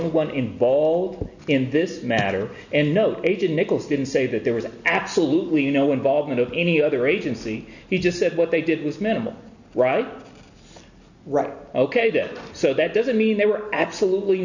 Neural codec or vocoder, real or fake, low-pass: none; real; 7.2 kHz